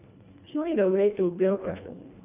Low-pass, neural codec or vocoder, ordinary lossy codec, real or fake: 3.6 kHz; codec, 24 kHz, 1.5 kbps, HILCodec; none; fake